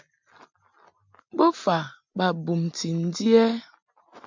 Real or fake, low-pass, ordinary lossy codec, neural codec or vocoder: real; 7.2 kHz; MP3, 64 kbps; none